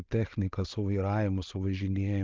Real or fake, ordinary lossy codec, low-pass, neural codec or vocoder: real; Opus, 32 kbps; 7.2 kHz; none